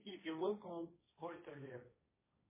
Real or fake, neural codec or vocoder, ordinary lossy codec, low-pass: fake; codec, 16 kHz, 1.1 kbps, Voila-Tokenizer; MP3, 16 kbps; 3.6 kHz